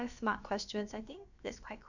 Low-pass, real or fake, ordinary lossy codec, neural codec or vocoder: 7.2 kHz; fake; none; codec, 16 kHz, about 1 kbps, DyCAST, with the encoder's durations